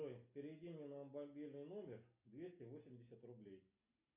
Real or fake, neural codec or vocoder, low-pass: real; none; 3.6 kHz